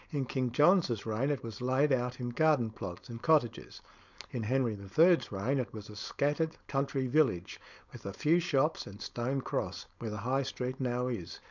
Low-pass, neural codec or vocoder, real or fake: 7.2 kHz; codec, 16 kHz, 4.8 kbps, FACodec; fake